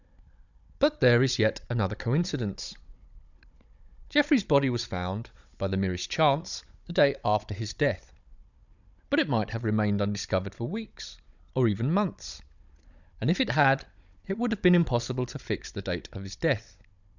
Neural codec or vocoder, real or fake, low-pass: codec, 16 kHz, 16 kbps, FunCodec, trained on Chinese and English, 50 frames a second; fake; 7.2 kHz